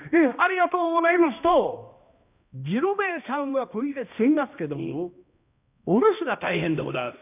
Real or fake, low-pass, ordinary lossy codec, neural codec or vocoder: fake; 3.6 kHz; none; codec, 16 kHz, 1 kbps, X-Codec, HuBERT features, trained on balanced general audio